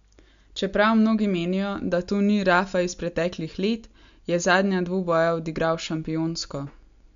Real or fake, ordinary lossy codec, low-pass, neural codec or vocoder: real; MP3, 64 kbps; 7.2 kHz; none